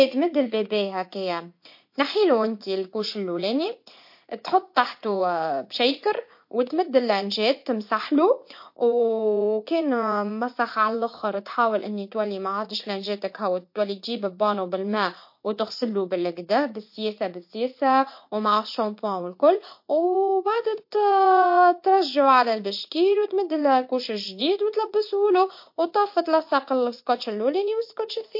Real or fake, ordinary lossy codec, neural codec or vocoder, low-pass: fake; MP3, 32 kbps; vocoder, 44.1 kHz, 80 mel bands, Vocos; 5.4 kHz